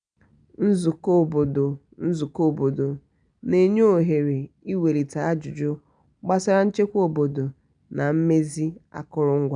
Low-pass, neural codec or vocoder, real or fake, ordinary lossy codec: 9.9 kHz; none; real; none